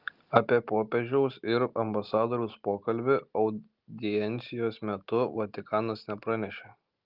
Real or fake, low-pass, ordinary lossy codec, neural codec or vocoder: real; 5.4 kHz; Opus, 32 kbps; none